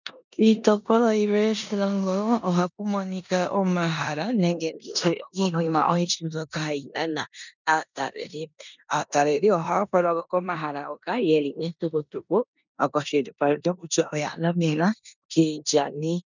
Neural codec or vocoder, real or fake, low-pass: codec, 16 kHz in and 24 kHz out, 0.9 kbps, LongCat-Audio-Codec, four codebook decoder; fake; 7.2 kHz